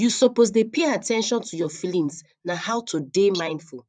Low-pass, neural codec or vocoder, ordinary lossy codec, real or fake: 9.9 kHz; vocoder, 44.1 kHz, 128 mel bands, Pupu-Vocoder; none; fake